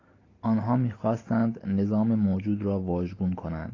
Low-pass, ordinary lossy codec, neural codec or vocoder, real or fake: 7.2 kHz; AAC, 32 kbps; none; real